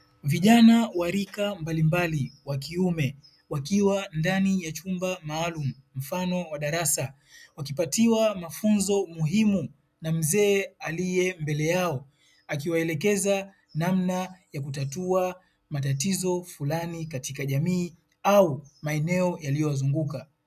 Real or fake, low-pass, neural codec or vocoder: real; 14.4 kHz; none